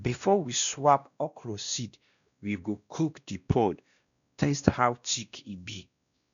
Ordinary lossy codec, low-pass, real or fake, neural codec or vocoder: none; 7.2 kHz; fake; codec, 16 kHz, 1 kbps, X-Codec, WavLM features, trained on Multilingual LibriSpeech